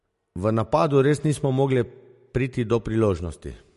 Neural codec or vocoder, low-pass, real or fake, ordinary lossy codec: none; 14.4 kHz; real; MP3, 48 kbps